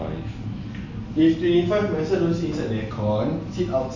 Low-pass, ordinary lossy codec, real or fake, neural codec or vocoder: 7.2 kHz; none; real; none